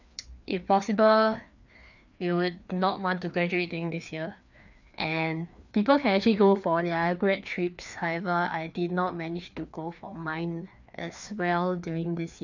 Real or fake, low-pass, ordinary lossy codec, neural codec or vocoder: fake; 7.2 kHz; none; codec, 16 kHz, 2 kbps, FreqCodec, larger model